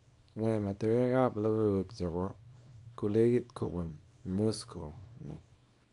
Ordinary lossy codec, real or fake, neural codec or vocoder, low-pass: none; fake; codec, 24 kHz, 0.9 kbps, WavTokenizer, small release; 10.8 kHz